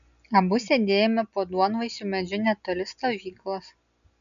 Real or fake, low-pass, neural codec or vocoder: real; 7.2 kHz; none